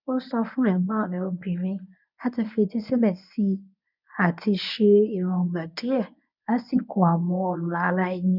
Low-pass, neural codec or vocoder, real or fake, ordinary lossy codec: 5.4 kHz; codec, 24 kHz, 0.9 kbps, WavTokenizer, medium speech release version 1; fake; none